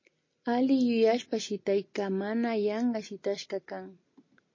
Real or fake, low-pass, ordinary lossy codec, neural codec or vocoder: real; 7.2 kHz; MP3, 32 kbps; none